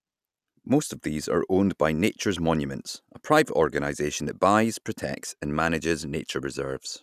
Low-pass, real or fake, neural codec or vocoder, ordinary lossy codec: 14.4 kHz; real; none; none